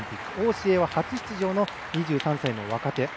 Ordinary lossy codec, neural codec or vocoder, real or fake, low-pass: none; none; real; none